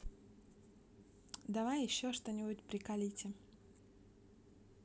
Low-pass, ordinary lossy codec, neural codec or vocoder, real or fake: none; none; none; real